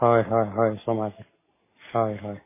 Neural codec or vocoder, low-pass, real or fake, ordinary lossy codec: none; 3.6 kHz; real; MP3, 16 kbps